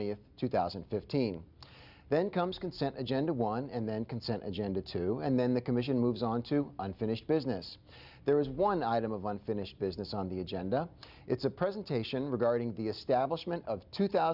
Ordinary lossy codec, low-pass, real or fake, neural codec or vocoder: Opus, 64 kbps; 5.4 kHz; real; none